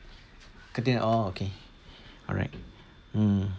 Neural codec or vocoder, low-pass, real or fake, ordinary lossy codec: none; none; real; none